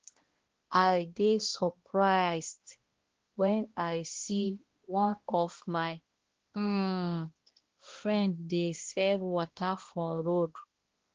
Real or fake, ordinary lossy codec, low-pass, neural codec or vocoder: fake; Opus, 16 kbps; 7.2 kHz; codec, 16 kHz, 1 kbps, X-Codec, HuBERT features, trained on balanced general audio